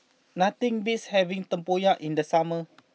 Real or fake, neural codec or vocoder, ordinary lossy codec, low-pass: real; none; none; none